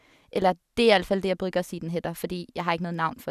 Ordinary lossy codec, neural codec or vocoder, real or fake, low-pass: none; none; real; 14.4 kHz